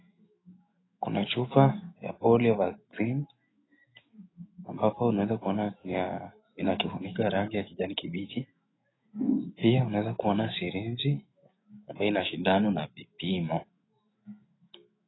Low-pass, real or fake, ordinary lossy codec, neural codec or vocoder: 7.2 kHz; fake; AAC, 16 kbps; autoencoder, 48 kHz, 128 numbers a frame, DAC-VAE, trained on Japanese speech